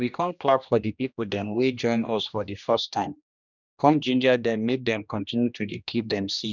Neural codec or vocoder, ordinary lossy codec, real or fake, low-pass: codec, 16 kHz, 1 kbps, X-Codec, HuBERT features, trained on general audio; none; fake; 7.2 kHz